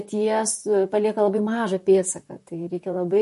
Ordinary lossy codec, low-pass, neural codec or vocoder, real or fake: MP3, 48 kbps; 14.4 kHz; vocoder, 44.1 kHz, 128 mel bands, Pupu-Vocoder; fake